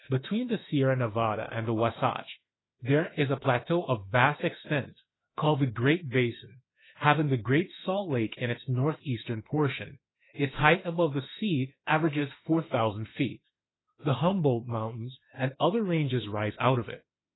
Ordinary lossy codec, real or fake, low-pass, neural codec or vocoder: AAC, 16 kbps; fake; 7.2 kHz; autoencoder, 48 kHz, 32 numbers a frame, DAC-VAE, trained on Japanese speech